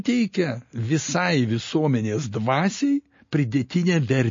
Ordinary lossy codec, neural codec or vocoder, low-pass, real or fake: MP3, 32 kbps; none; 7.2 kHz; real